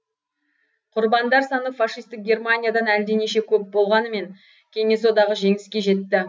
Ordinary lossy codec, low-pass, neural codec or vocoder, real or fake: none; none; none; real